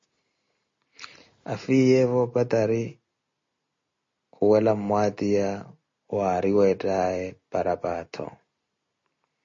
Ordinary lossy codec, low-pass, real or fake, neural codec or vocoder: MP3, 32 kbps; 7.2 kHz; real; none